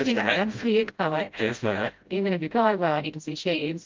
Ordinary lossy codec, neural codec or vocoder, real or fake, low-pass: Opus, 32 kbps; codec, 16 kHz, 0.5 kbps, FreqCodec, smaller model; fake; 7.2 kHz